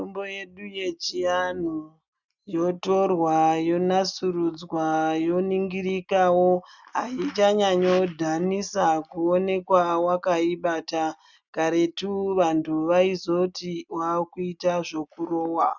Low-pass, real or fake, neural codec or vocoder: 7.2 kHz; real; none